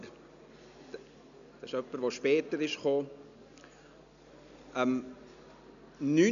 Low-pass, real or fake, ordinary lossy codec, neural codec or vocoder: 7.2 kHz; real; none; none